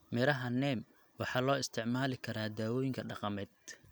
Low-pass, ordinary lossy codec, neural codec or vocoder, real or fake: none; none; none; real